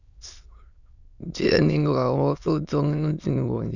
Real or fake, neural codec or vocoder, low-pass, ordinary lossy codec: fake; autoencoder, 22.05 kHz, a latent of 192 numbers a frame, VITS, trained on many speakers; 7.2 kHz; none